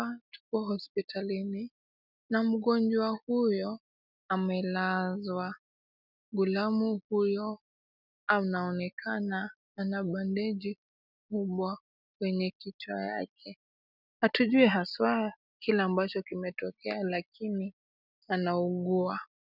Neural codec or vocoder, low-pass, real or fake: none; 5.4 kHz; real